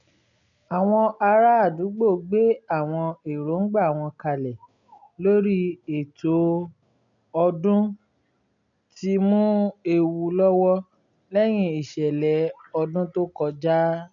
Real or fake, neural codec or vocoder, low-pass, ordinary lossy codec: real; none; 7.2 kHz; MP3, 96 kbps